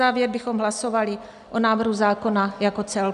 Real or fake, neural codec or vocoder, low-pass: real; none; 10.8 kHz